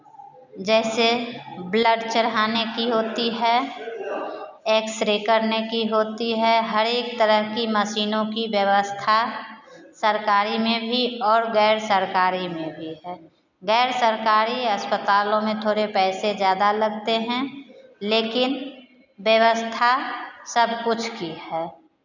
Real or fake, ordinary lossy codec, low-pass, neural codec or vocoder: real; none; 7.2 kHz; none